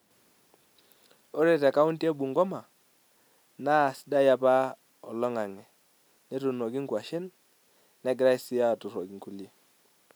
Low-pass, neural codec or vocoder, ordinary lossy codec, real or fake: none; none; none; real